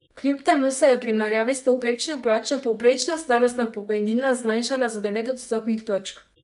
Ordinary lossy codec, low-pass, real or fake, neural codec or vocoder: none; 10.8 kHz; fake; codec, 24 kHz, 0.9 kbps, WavTokenizer, medium music audio release